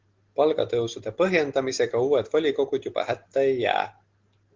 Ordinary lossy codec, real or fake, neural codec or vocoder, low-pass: Opus, 16 kbps; real; none; 7.2 kHz